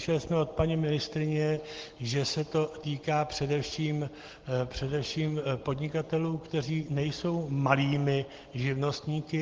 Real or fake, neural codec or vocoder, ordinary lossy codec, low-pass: real; none; Opus, 16 kbps; 7.2 kHz